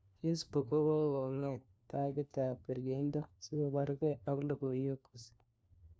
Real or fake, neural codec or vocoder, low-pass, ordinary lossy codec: fake; codec, 16 kHz, 1 kbps, FunCodec, trained on LibriTTS, 50 frames a second; none; none